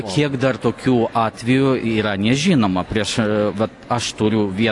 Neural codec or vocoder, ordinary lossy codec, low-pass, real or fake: none; AAC, 48 kbps; 10.8 kHz; real